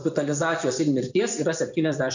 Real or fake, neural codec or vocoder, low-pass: fake; vocoder, 24 kHz, 100 mel bands, Vocos; 7.2 kHz